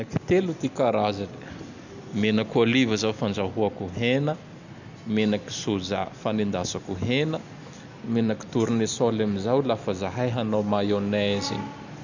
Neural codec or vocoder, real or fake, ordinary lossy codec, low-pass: none; real; none; 7.2 kHz